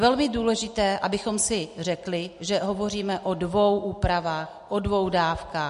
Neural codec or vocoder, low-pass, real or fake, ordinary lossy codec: none; 14.4 kHz; real; MP3, 48 kbps